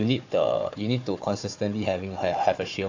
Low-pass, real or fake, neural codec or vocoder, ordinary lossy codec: 7.2 kHz; fake; codec, 16 kHz, 4 kbps, FunCodec, trained on Chinese and English, 50 frames a second; AAC, 48 kbps